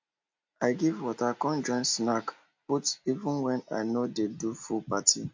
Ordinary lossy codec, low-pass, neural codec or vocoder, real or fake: MP3, 48 kbps; 7.2 kHz; none; real